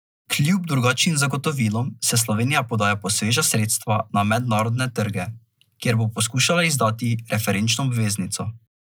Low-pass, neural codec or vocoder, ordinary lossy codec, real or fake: none; none; none; real